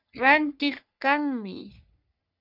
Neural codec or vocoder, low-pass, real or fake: codec, 44.1 kHz, 3.4 kbps, Pupu-Codec; 5.4 kHz; fake